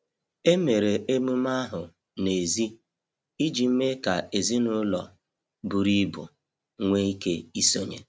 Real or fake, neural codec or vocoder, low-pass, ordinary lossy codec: real; none; none; none